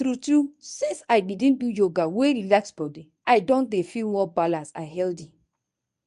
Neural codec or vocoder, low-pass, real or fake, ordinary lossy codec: codec, 24 kHz, 0.9 kbps, WavTokenizer, medium speech release version 1; 10.8 kHz; fake; none